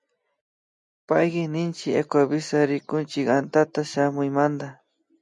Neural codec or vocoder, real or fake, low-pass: none; real; 10.8 kHz